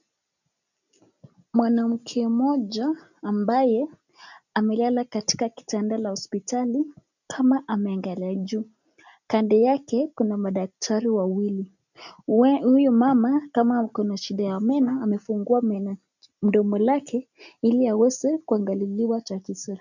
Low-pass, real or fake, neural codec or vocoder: 7.2 kHz; real; none